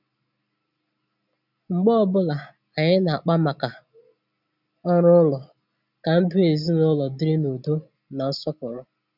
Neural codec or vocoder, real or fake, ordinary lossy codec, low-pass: none; real; none; 5.4 kHz